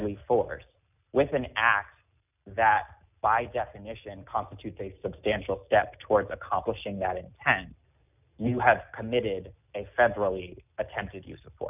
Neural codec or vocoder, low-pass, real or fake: none; 3.6 kHz; real